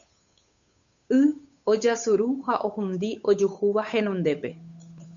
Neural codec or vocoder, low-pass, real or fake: codec, 16 kHz, 8 kbps, FunCodec, trained on Chinese and English, 25 frames a second; 7.2 kHz; fake